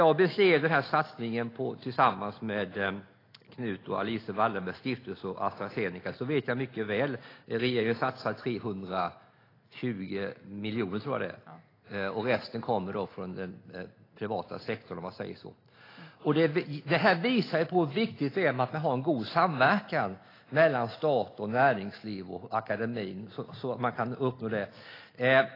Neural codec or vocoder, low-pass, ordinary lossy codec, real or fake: none; 5.4 kHz; AAC, 24 kbps; real